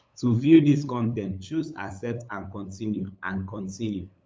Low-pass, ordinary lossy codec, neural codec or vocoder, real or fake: none; none; codec, 16 kHz, 8 kbps, FunCodec, trained on LibriTTS, 25 frames a second; fake